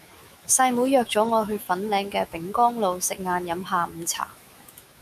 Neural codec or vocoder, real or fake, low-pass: autoencoder, 48 kHz, 128 numbers a frame, DAC-VAE, trained on Japanese speech; fake; 14.4 kHz